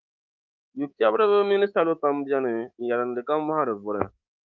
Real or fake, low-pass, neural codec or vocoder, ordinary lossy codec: fake; 7.2 kHz; codec, 24 kHz, 3.1 kbps, DualCodec; Opus, 24 kbps